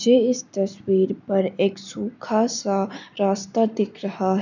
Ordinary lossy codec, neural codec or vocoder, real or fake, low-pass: none; none; real; 7.2 kHz